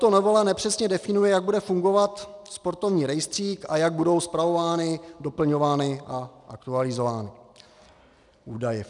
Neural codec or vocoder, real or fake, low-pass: none; real; 10.8 kHz